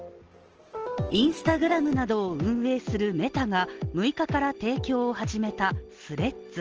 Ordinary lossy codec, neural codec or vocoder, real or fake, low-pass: Opus, 16 kbps; none; real; 7.2 kHz